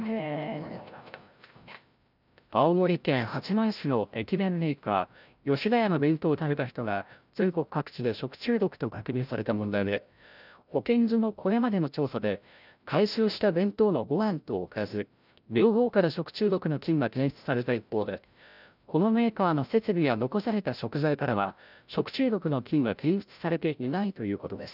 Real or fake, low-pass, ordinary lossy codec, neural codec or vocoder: fake; 5.4 kHz; none; codec, 16 kHz, 0.5 kbps, FreqCodec, larger model